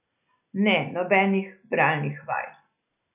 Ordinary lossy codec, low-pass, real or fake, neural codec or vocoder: none; 3.6 kHz; real; none